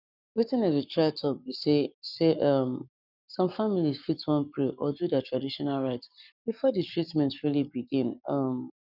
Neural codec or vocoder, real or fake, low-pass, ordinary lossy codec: codec, 44.1 kHz, 7.8 kbps, Pupu-Codec; fake; 5.4 kHz; none